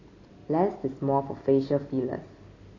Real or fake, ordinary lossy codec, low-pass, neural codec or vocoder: real; AAC, 32 kbps; 7.2 kHz; none